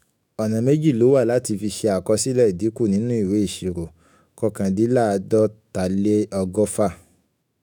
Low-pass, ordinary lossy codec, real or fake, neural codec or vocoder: none; none; fake; autoencoder, 48 kHz, 128 numbers a frame, DAC-VAE, trained on Japanese speech